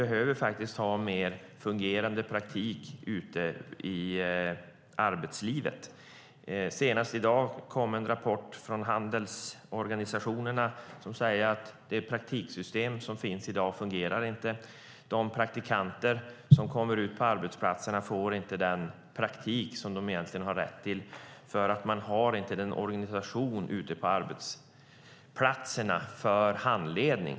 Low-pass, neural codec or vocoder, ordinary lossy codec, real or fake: none; none; none; real